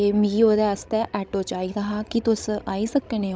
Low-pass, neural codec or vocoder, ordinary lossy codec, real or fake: none; codec, 16 kHz, 16 kbps, FreqCodec, larger model; none; fake